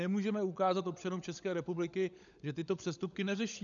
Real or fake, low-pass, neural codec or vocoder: fake; 7.2 kHz; codec, 16 kHz, 16 kbps, FunCodec, trained on LibriTTS, 50 frames a second